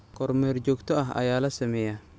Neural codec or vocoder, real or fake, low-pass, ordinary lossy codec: none; real; none; none